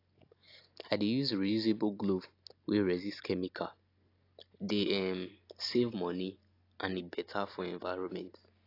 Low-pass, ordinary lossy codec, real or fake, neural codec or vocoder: 5.4 kHz; AAC, 32 kbps; real; none